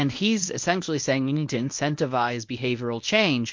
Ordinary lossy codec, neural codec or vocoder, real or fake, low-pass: MP3, 48 kbps; codec, 24 kHz, 0.9 kbps, WavTokenizer, medium speech release version 1; fake; 7.2 kHz